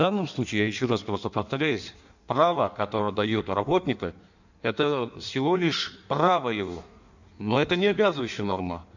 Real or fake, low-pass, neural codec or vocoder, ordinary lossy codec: fake; 7.2 kHz; codec, 16 kHz in and 24 kHz out, 1.1 kbps, FireRedTTS-2 codec; none